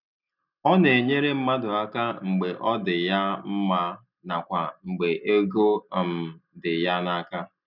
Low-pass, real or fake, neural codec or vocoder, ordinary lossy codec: 5.4 kHz; real; none; none